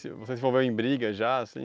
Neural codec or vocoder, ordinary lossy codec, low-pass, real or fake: none; none; none; real